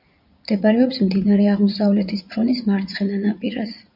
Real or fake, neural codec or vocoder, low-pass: fake; vocoder, 44.1 kHz, 80 mel bands, Vocos; 5.4 kHz